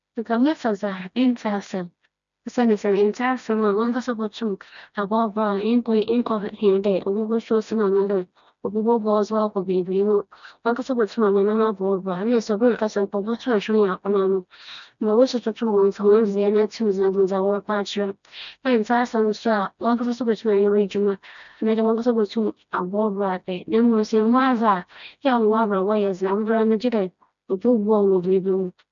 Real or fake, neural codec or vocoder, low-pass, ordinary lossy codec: fake; codec, 16 kHz, 1 kbps, FreqCodec, smaller model; 7.2 kHz; none